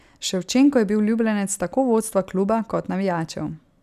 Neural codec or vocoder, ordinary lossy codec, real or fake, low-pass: none; none; real; 14.4 kHz